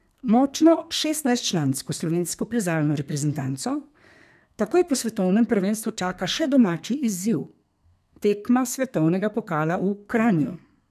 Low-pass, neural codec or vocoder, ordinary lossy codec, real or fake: 14.4 kHz; codec, 32 kHz, 1.9 kbps, SNAC; none; fake